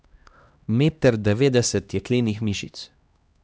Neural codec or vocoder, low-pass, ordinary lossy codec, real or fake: codec, 16 kHz, 1 kbps, X-Codec, HuBERT features, trained on LibriSpeech; none; none; fake